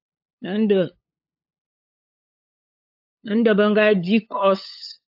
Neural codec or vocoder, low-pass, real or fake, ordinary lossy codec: codec, 16 kHz, 8 kbps, FunCodec, trained on LibriTTS, 25 frames a second; 5.4 kHz; fake; AAC, 48 kbps